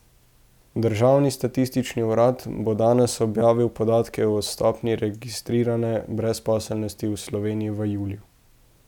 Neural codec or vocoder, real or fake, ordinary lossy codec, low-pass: none; real; none; 19.8 kHz